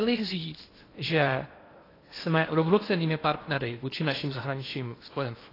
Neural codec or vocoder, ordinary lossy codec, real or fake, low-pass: codec, 16 kHz in and 24 kHz out, 0.8 kbps, FocalCodec, streaming, 65536 codes; AAC, 24 kbps; fake; 5.4 kHz